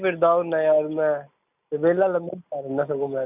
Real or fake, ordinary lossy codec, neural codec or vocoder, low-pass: real; none; none; 3.6 kHz